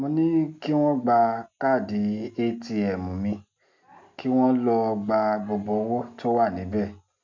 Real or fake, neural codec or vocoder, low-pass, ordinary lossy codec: real; none; 7.2 kHz; MP3, 64 kbps